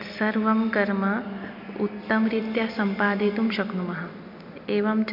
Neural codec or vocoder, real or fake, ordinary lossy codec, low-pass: none; real; MP3, 48 kbps; 5.4 kHz